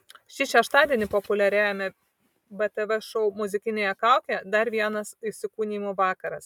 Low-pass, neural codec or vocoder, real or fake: 19.8 kHz; none; real